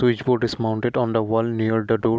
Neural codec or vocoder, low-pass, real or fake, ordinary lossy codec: none; none; real; none